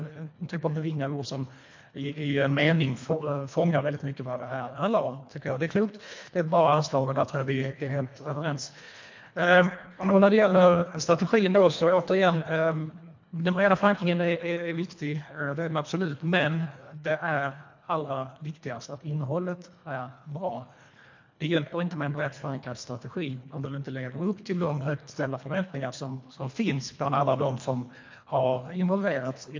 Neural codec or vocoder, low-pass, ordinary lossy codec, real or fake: codec, 24 kHz, 1.5 kbps, HILCodec; 7.2 kHz; MP3, 48 kbps; fake